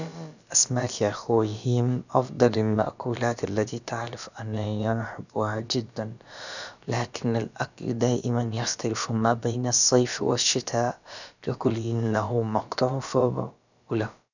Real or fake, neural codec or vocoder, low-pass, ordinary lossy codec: fake; codec, 16 kHz, about 1 kbps, DyCAST, with the encoder's durations; 7.2 kHz; none